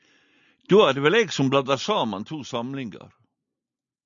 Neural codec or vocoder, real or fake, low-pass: none; real; 7.2 kHz